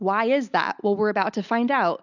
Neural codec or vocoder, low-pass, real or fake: none; 7.2 kHz; real